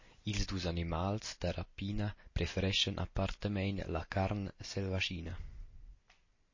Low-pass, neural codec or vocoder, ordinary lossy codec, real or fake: 7.2 kHz; none; MP3, 32 kbps; real